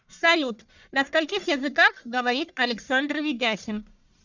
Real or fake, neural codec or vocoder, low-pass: fake; codec, 44.1 kHz, 1.7 kbps, Pupu-Codec; 7.2 kHz